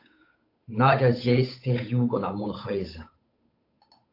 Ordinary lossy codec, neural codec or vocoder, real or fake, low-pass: AAC, 32 kbps; codec, 16 kHz, 8 kbps, FunCodec, trained on Chinese and English, 25 frames a second; fake; 5.4 kHz